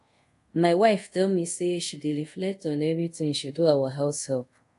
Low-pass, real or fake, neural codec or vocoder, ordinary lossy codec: 10.8 kHz; fake; codec, 24 kHz, 0.5 kbps, DualCodec; AAC, 64 kbps